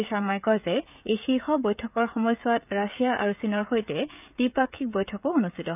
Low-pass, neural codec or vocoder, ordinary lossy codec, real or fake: 3.6 kHz; codec, 16 kHz, 16 kbps, FreqCodec, smaller model; none; fake